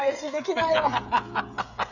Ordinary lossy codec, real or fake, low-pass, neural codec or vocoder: none; fake; 7.2 kHz; codec, 16 kHz, 8 kbps, FreqCodec, smaller model